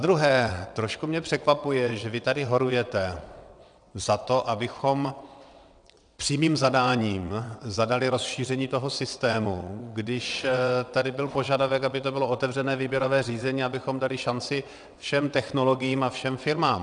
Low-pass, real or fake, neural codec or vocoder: 9.9 kHz; fake; vocoder, 22.05 kHz, 80 mel bands, WaveNeXt